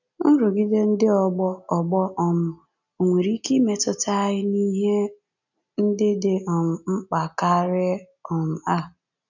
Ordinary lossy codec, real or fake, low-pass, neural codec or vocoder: none; real; 7.2 kHz; none